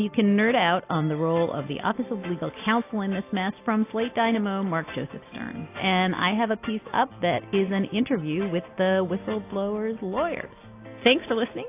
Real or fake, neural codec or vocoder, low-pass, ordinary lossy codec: real; none; 3.6 kHz; AAC, 24 kbps